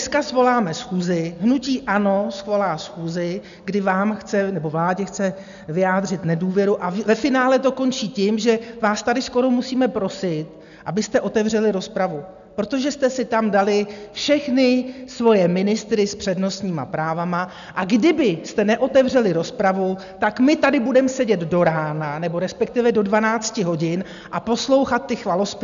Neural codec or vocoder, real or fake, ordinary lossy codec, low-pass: none; real; MP3, 96 kbps; 7.2 kHz